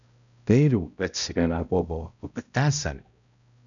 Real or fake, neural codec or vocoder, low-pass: fake; codec, 16 kHz, 0.5 kbps, X-Codec, HuBERT features, trained on balanced general audio; 7.2 kHz